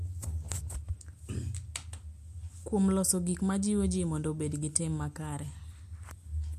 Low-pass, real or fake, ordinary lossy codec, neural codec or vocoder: 14.4 kHz; real; MP3, 64 kbps; none